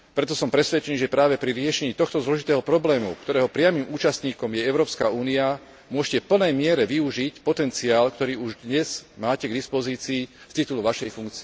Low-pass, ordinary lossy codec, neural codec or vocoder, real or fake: none; none; none; real